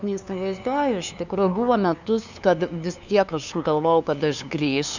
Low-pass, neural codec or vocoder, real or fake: 7.2 kHz; codec, 16 kHz, 2 kbps, FunCodec, trained on LibriTTS, 25 frames a second; fake